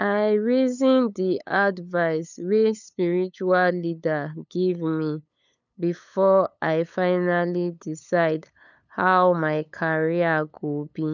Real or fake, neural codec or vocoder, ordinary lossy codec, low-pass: fake; codec, 16 kHz, 8 kbps, FunCodec, trained on LibriTTS, 25 frames a second; none; 7.2 kHz